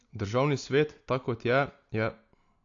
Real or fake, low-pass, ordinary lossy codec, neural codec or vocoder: real; 7.2 kHz; AAC, 48 kbps; none